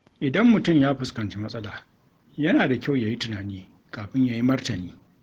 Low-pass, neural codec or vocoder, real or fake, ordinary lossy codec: 19.8 kHz; none; real; Opus, 16 kbps